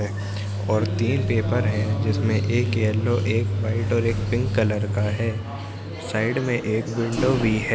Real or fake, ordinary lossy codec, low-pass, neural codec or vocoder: real; none; none; none